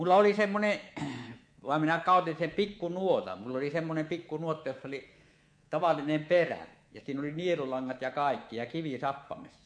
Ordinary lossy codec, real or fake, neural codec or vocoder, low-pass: MP3, 48 kbps; fake; codec, 24 kHz, 3.1 kbps, DualCodec; 9.9 kHz